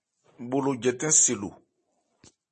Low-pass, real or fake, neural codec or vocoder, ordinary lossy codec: 10.8 kHz; real; none; MP3, 32 kbps